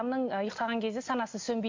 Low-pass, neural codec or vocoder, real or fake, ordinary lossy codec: 7.2 kHz; none; real; MP3, 48 kbps